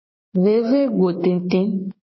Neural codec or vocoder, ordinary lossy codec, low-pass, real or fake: codec, 16 kHz, 6 kbps, DAC; MP3, 24 kbps; 7.2 kHz; fake